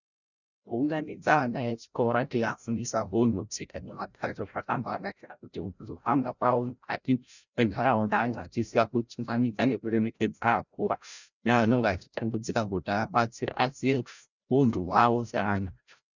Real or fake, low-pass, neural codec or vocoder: fake; 7.2 kHz; codec, 16 kHz, 0.5 kbps, FreqCodec, larger model